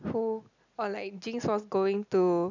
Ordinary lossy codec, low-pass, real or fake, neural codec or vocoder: none; 7.2 kHz; real; none